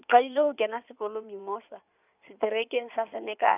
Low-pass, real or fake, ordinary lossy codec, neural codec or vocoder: 3.6 kHz; fake; none; codec, 16 kHz in and 24 kHz out, 2.2 kbps, FireRedTTS-2 codec